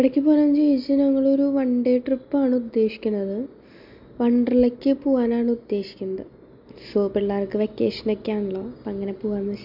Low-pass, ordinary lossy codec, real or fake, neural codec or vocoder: 5.4 kHz; MP3, 48 kbps; real; none